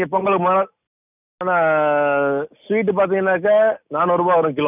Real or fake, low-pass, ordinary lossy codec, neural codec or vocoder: real; 3.6 kHz; none; none